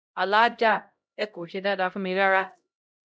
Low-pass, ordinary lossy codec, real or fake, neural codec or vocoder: none; none; fake; codec, 16 kHz, 0.5 kbps, X-Codec, HuBERT features, trained on LibriSpeech